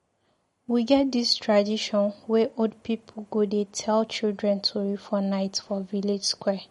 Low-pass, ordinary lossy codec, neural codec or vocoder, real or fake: 19.8 kHz; MP3, 48 kbps; none; real